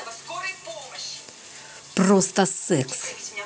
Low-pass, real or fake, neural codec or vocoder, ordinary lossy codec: none; real; none; none